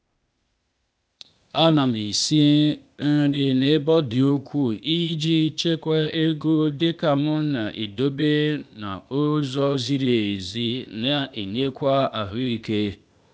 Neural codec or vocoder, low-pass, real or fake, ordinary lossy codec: codec, 16 kHz, 0.8 kbps, ZipCodec; none; fake; none